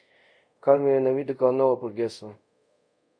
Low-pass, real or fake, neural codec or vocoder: 9.9 kHz; fake; codec, 24 kHz, 0.5 kbps, DualCodec